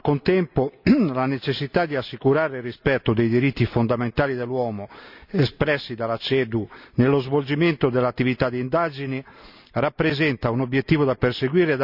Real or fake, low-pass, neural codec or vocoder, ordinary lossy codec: real; 5.4 kHz; none; none